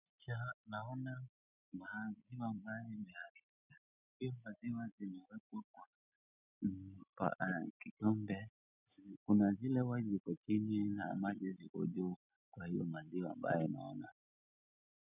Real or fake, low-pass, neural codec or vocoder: real; 3.6 kHz; none